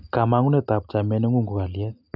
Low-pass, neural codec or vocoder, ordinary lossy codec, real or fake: 5.4 kHz; none; none; real